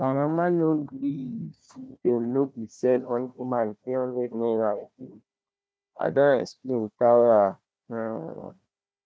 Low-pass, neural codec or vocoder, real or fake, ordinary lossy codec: none; codec, 16 kHz, 1 kbps, FunCodec, trained on Chinese and English, 50 frames a second; fake; none